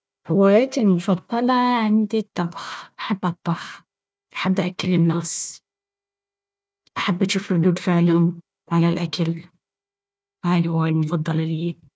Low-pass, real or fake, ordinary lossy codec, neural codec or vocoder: none; fake; none; codec, 16 kHz, 1 kbps, FunCodec, trained on Chinese and English, 50 frames a second